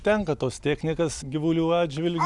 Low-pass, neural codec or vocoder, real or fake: 10.8 kHz; none; real